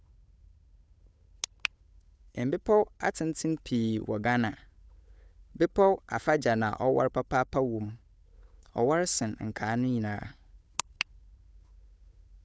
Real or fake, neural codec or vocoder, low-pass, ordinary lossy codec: fake; codec, 16 kHz, 8 kbps, FunCodec, trained on Chinese and English, 25 frames a second; none; none